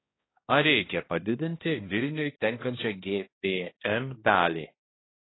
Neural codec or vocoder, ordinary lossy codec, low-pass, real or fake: codec, 16 kHz, 1 kbps, X-Codec, HuBERT features, trained on balanced general audio; AAC, 16 kbps; 7.2 kHz; fake